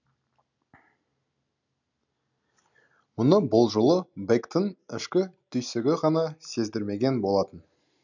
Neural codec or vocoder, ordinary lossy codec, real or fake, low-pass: none; none; real; 7.2 kHz